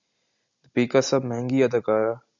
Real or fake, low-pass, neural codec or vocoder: real; 7.2 kHz; none